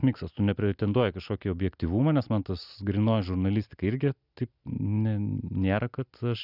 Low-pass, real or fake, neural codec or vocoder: 5.4 kHz; real; none